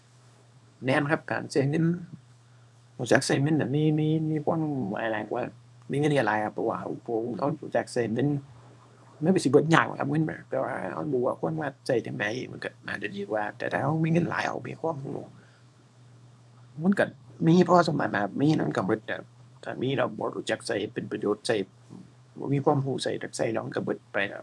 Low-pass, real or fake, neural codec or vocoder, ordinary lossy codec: none; fake; codec, 24 kHz, 0.9 kbps, WavTokenizer, small release; none